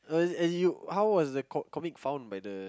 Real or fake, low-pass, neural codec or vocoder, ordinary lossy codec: real; none; none; none